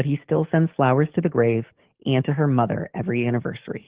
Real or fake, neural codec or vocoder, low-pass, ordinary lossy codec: fake; codec, 44.1 kHz, 7.8 kbps, DAC; 3.6 kHz; Opus, 16 kbps